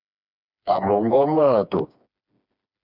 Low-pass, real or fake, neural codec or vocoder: 5.4 kHz; fake; codec, 16 kHz, 2 kbps, FreqCodec, smaller model